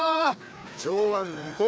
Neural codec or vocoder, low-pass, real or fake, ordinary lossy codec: codec, 16 kHz, 2 kbps, FreqCodec, larger model; none; fake; none